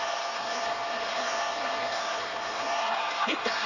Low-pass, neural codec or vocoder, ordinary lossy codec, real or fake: 7.2 kHz; codec, 24 kHz, 1 kbps, SNAC; none; fake